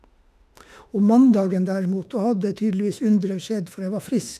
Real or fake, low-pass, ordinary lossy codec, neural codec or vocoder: fake; 14.4 kHz; none; autoencoder, 48 kHz, 32 numbers a frame, DAC-VAE, trained on Japanese speech